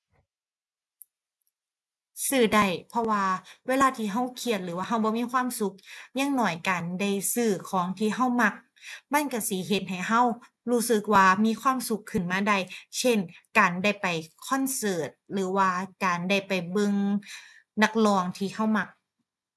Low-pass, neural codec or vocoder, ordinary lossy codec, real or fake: none; none; none; real